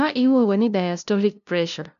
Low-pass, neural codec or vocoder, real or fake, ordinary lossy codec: 7.2 kHz; codec, 16 kHz, 0.5 kbps, FunCodec, trained on LibriTTS, 25 frames a second; fake; none